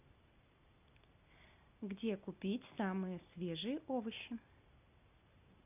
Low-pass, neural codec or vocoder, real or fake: 3.6 kHz; none; real